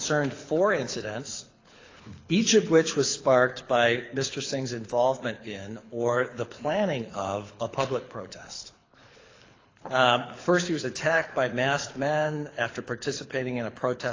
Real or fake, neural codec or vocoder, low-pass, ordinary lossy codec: fake; codec, 24 kHz, 6 kbps, HILCodec; 7.2 kHz; AAC, 32 kbps